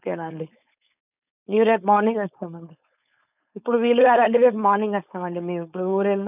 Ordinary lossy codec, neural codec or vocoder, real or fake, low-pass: none; codec, 16 kHz, 4.8 kbps, FACodec; fake; 3.6 kHz